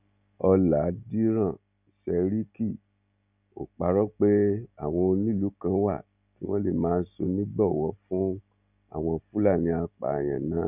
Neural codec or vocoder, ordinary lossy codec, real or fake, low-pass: none; none; real; 3.6 kHz